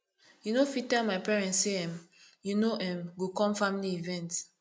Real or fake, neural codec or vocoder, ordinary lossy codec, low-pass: real; none; none; none